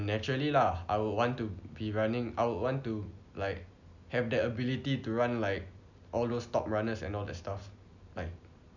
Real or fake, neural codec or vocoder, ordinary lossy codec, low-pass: real; none; none; 7.2 kHz